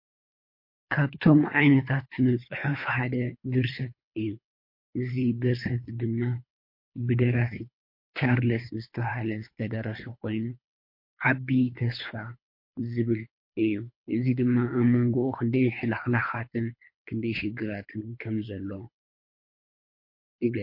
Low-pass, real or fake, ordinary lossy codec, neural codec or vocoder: 5.4 kHz; fake; MP3, 32 kbps; codec, 24 kHz, 3 kbps, HILCodec